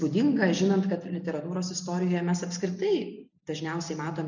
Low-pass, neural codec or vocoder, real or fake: 7.2 kHz; none; real